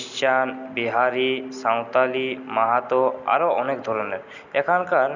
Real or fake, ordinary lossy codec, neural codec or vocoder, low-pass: real; none; none; 7.2 kHz